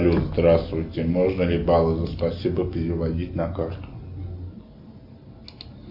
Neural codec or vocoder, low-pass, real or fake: none; 5.4 kHz; real